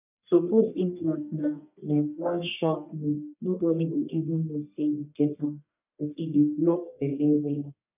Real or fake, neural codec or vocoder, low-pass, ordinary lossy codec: fake; codec, 44.1 kHz, 1.7 kbps, Pupu-Codec; 3.6 kHz; none